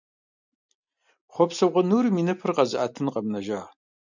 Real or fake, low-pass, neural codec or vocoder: real; 7.2 kHz; none